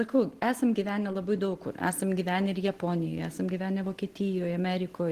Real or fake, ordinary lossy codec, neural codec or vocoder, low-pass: real; Opus, 16 kbps; none; 14.4 kHz